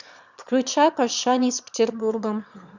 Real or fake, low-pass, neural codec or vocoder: fake; 7.2 kHz; autoencoder, 22.05 kHz, a latent of 192 numbers a frame, VITS, trained on one speaker